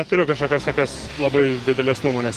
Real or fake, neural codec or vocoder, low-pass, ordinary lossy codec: fake; codec, 44.1 kHz, 2.6 kbps, SNAC; 14.4 kHz; Opus, 24 kbps